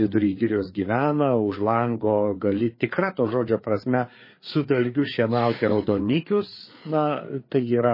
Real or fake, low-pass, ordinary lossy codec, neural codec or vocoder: fake; 5.4 kHz; MP3, 24 kbps; codec, 16 kHz, 4 kbps, FreqCodec, larger model